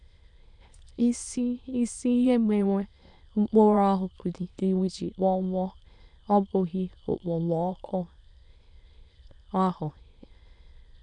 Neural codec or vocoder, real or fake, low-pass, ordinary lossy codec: autoencoder, 22.05 kHz, a latent of 192 numbers a frame, VITS, trained on many speakers; fake; 9.9 kHz; none